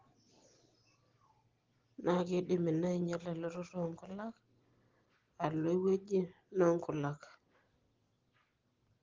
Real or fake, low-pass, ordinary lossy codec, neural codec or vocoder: real; 7.2 kHz; Opus, 16 kbps; none